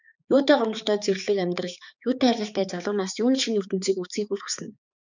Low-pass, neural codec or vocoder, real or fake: 7.2 kHz; codec, 16 kHz, 4 kbps, X-Codec, HuBERT features, trained on balanced general audio; fake